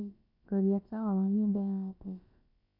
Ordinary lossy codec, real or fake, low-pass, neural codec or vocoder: AAC, 32 kbps; fake; 5.4 kHz; codec, 16 kHz, about 1 kbps, DyCAST, with the encoder's durations